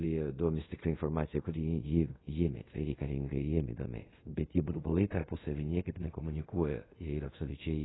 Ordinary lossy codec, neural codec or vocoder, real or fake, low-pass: AAC, 16 kbps; codec, 24 kHz, 0.5 kbps, DualCodec; fake; 7.2 kHz